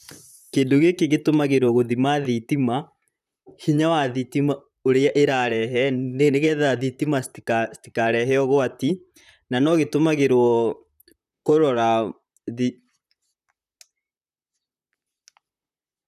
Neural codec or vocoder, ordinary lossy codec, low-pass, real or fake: vocoder, 44.1 kHz, 128 mel bands, Pupu-Vocoder; none; 14.4 kHz; fake